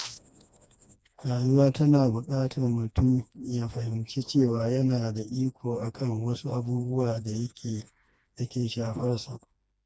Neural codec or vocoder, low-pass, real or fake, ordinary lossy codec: codec, 16 kHz, 2 kbps, FreqCodec, smaller model; none; fake; none